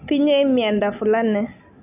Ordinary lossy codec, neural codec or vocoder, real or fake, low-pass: none; none; real; 3.6 kHz